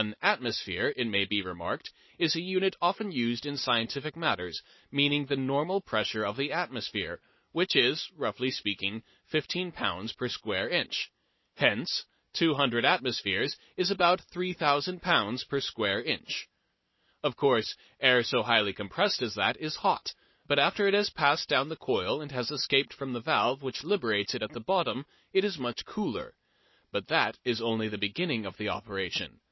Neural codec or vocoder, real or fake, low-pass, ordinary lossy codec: none; real; 7.2 kHz; MP3, 24 kbps